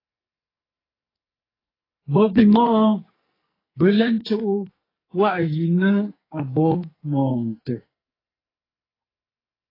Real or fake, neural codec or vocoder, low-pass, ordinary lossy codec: fake; codec, 44.1 kHz, 2.6 kbps, SNAC; 5.4 kHz; AAC, 24 kbps